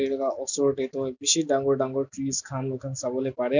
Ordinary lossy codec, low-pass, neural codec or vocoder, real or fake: none; 7.2 kHz; none; real